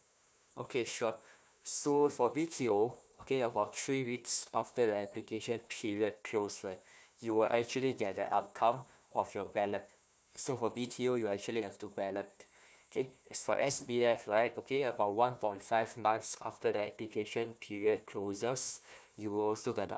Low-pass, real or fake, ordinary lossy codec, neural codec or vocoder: none; fake; none; codec, 16 kHz, 1 kbps, FunCodec, trained on Chinese and English, 50 frames a second